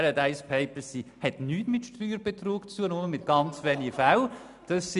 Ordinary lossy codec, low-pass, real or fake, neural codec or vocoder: MP3, 96 kbps; 10.8 kHz; real; none